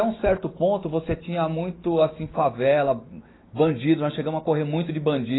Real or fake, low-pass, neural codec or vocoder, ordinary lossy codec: real; 7.2 kHz; none; AAC, 16 kbps